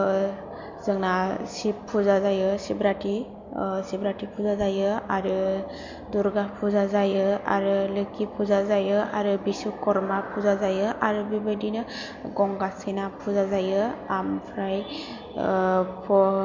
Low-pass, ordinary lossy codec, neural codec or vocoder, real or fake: 7.2 kHz; MP3, 48 kbps; none; real